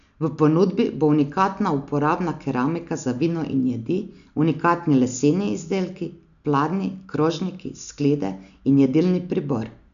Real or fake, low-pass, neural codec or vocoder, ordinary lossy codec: real; 7.2 kHz; none; none